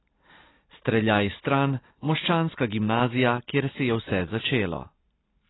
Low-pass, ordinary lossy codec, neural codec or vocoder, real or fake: 7.2 kHz; AAC, 16 kbps; none; real